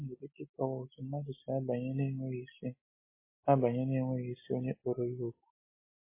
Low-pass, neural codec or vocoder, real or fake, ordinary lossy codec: 3.6 kHz; none; real; MP3, 16 kbps